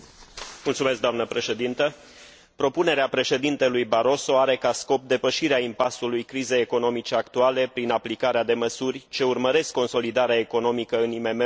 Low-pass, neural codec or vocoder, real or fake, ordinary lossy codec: none; none; real; none